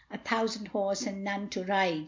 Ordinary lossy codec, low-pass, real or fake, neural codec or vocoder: MP3, 64 kbps; 7.2 kHz; real; none